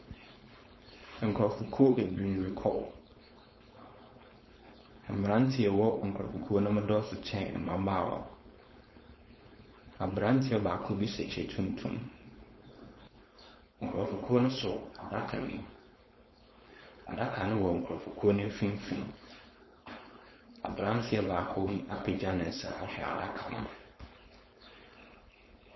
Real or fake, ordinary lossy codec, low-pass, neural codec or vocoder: fake; MP3, 24 kbps; 7.2 kHz; codec, 16 kHz, 4.8 kbps, FACodec